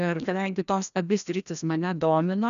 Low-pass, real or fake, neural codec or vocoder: 7.2 kHz; fake; codec, 16 kHz, 1 kbps, FreqCodec, larger model